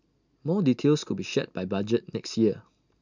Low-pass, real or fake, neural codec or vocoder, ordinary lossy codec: 7.2 kHz; real; none; none